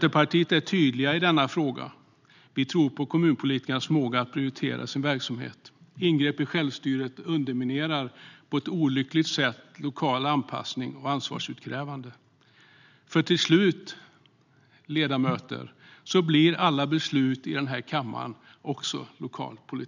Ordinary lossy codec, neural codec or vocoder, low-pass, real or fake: none; none; 7.2 kHz; real